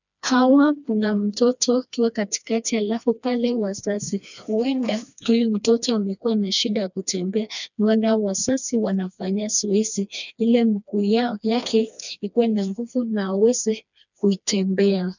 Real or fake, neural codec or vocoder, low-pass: fake; codec, 16 kHz, 2 kbps, FreqCodec, smaller model; 7.2 kHz